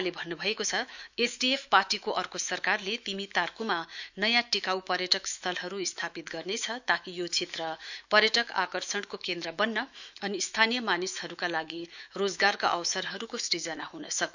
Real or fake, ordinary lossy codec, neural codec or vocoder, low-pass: fake; none; autoencoder, 48 kHz, 128 numbers a frame, DAC-VAE, trained on Japanese speech; 7.2 kHz